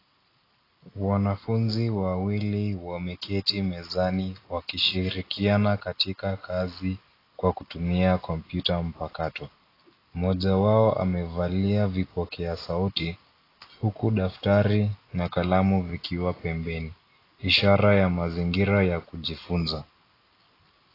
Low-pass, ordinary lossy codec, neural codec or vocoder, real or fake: 5.4 kHz; AAC, 24 kbps; none; real